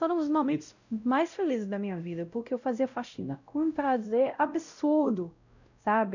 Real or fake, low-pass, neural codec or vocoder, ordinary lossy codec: fake; 7.2 kHz; codec, 16 kHz, 0.5 kbps, X-Codec, WavLM features, trained on Multilingual LibriSpeech; none